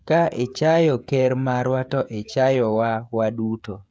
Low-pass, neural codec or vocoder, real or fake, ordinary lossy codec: none; codec, 16 kHz, 16 kbps, FreqCodec, smaller model; fake; none